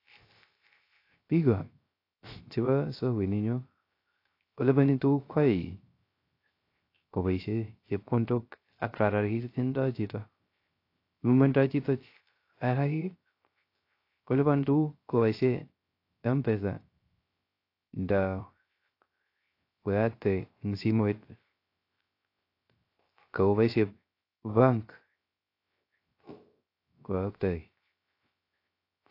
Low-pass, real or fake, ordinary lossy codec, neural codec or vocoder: 5.4 kHz; fake; AAC, 32 kbps; codec, 16 kHz, 0.3 kbps, FocalCodec